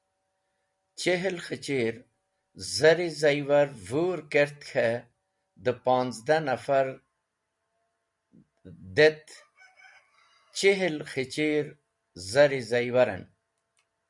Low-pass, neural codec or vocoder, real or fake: 10.8 kHz; none; real